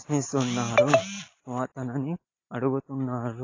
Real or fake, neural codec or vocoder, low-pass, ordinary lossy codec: real; none; 7.2 kHz; AAC, 32 kbps